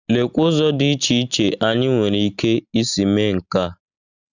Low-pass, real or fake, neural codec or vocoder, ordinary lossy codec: 7.2 kHz; real; none; none